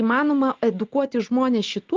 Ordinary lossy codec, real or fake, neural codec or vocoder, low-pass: Opus, 24 kbps; real; none; 7.2 kHz